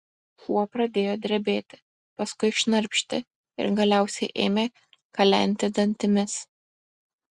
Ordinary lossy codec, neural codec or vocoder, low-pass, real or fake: Opus, 64 kbps; none; 10.8 kHz; real